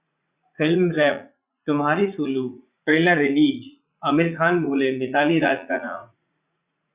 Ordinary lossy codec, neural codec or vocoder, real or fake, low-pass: Opus, 64 kbps; vocoder, 44.1 kHz, 80 mel bands, Vocos; fake; 3.6 kHz